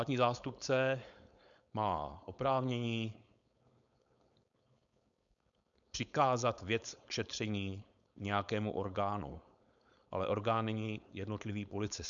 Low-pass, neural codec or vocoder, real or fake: 7.2 kHz; codec, 16 kHz, 4.8 kbps, FACodec; fake